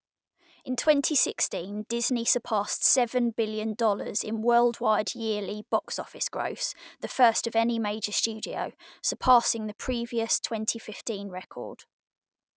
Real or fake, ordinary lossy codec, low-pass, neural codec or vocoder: real; none; none; none